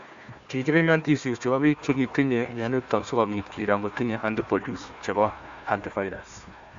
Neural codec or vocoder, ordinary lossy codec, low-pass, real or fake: codec, 16 kHz, 1 kbps, FunCodec, trained on Chinese and English, 50 frames a second; AAC, 64 kbps; 7.2 kHz; fake